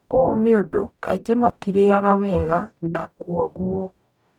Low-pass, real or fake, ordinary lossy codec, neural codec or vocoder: 19.8 kHz; fake; none; codec, 44.1 kHz, 0.9 kbps, DAC